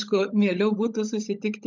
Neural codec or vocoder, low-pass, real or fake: codec, 16 kHz, 16 kbps, FunCodec, trained on LibriTTS, 50 frames a second; 7.2 kHz; fake